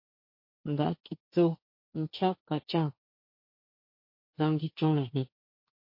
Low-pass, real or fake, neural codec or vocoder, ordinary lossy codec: 5.4 kHz; fake; codec, 16 kHz, 1.1 kbps, Voila-Tokenizer; MP3, 32 kbps